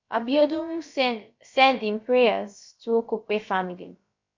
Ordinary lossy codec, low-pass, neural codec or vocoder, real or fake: MP3, 48 kbps; 7.2 kHz; codec, 16 kHz, about 1 kbps, DyCAST, with the encoder's durations; fake